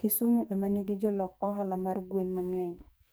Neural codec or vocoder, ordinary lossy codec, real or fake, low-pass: codec, 44.1 kHz, 2.6 kbps, SNAC; none; fake; none